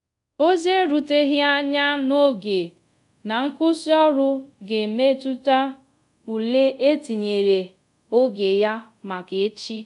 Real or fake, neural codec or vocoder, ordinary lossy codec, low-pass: fake; codec, 24 kHz, 0.5 kbps, DualCodec; none; 10.8 kHz